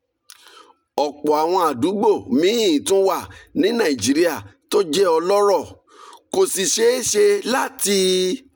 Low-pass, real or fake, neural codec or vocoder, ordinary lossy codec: 19.8 kHz; real; none; none